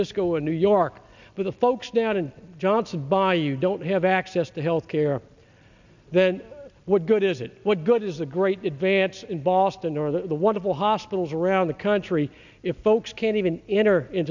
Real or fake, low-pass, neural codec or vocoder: real; 7.2 kHz; none